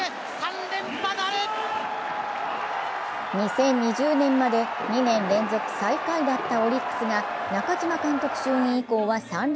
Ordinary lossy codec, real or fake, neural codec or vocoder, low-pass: none; real; none; none